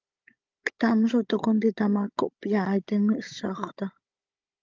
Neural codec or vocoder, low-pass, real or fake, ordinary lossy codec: codec, 16 kHz, 16 kbps, FunCodec, trained on Chinese and English, 50 frames a second; 7.2 kHz; fake; Opus, 32 kbps